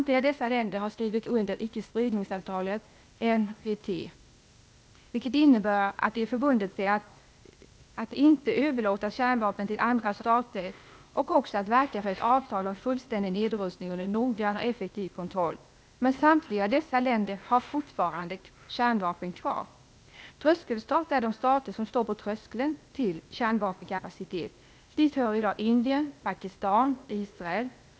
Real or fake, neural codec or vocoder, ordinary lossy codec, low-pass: fake; codec, 16 kHz, 0.8 kbps, ZipCodec; none; none